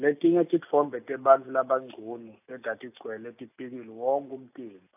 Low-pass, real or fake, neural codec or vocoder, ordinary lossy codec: 3.6 kHz; real; none; AAC, 32 kbps